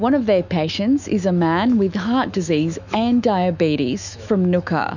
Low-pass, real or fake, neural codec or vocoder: 7.2 kHz; fake; autoencoder, 48 kHz, 128 numbers a frame, DAC-VAE, trained on Japanese speech